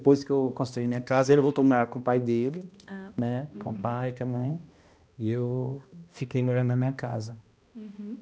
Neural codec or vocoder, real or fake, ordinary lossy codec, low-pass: codec, 16 kHz, 1 kbps, X-Codec, HuBERT features, trained on balanced general audio; fake; none; none